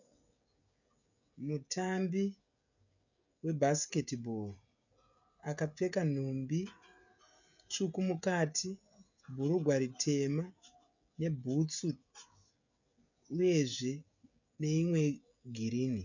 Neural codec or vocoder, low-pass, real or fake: codec, 16 kHz, 16 kbps, FreqCodec, smaller model; 7.2 kHz; fake